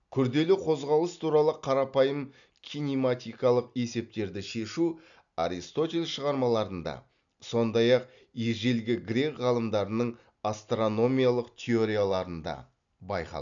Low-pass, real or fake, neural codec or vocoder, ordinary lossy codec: 7.2 kHz; real; none; none